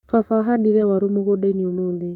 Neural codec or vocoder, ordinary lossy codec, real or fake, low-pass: codec, 44.1 kHz, 7.8 kbps, Pupu-Codec; none; fake; 19.8 kHz